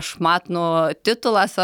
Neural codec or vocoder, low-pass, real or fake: none; 19.8 kHz; real